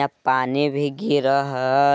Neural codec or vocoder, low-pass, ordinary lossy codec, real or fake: none; none; none; real